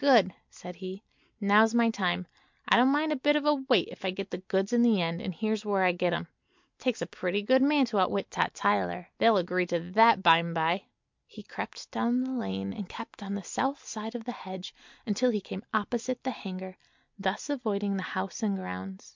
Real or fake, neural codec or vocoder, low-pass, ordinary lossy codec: real; none; 7.2 kHz; MP3, 64 kbps